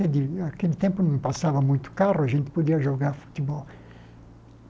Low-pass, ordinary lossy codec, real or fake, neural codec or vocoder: none; none; real; none